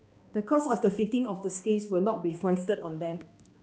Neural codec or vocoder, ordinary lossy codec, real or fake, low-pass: codec, 16 kHz, 1 kbps, X-Codec, HuBERT features, trained on balanced general audio; none; fake; none